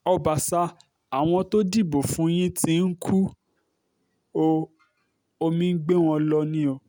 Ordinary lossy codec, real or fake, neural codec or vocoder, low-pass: none; real; none; none